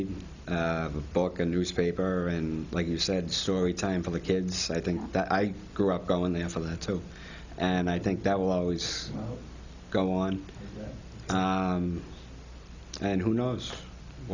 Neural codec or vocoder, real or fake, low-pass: none; real; 7.2 kHz